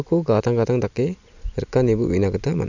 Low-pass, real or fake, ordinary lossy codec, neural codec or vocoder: 7.2 kHz; real; none; none